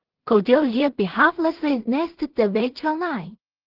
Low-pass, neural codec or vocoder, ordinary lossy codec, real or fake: 5.4 kHz; codec, 16 kHz in and 24 kHz out, 0.4 kbps, LongCat-Audio-Codec, two codebook decoder; Opus, 16 kbps; fake